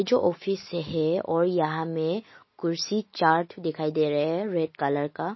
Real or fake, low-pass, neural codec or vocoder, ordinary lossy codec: real; 7.2 kHz; none; MP3, 24 kbps